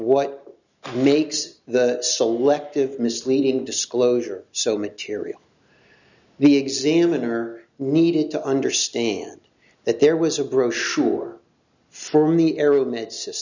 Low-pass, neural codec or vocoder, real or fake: 7.2 kHz; none; real